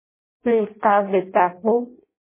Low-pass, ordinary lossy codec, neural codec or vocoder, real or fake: 3.6 kHz; MP3, 16 kbps; codec, 16 kHz in and 24 kHz out, 0.6 kbps, FireRedTTS-2 codec; fake